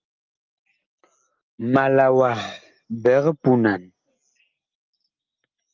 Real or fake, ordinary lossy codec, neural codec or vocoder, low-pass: real; Opus, 24 kbps; none; 7.2 kHz